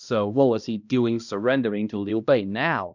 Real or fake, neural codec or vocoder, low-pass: fake; codec, 16 kHz, 1 kbps, X-Codec, HuBERT features, trained on balanced general audio; 7.2 kHz